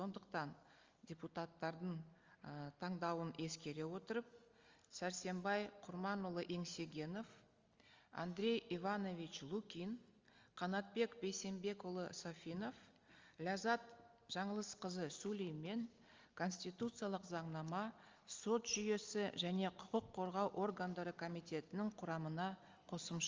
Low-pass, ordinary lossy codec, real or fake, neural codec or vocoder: 7.2 kHz; Opus, 32 kbps; real; none